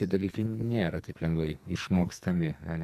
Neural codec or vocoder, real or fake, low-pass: codec, 44.1 kHz, 2.6 kbps, SNAC; fake; 14.4 kHz